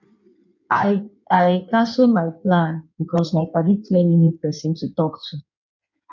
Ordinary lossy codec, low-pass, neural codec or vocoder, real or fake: none; 7.2 kHz; codec, 16 kHz in and 24 kHz out, 1.1 kbps, FireRedTTS-2 codec; fake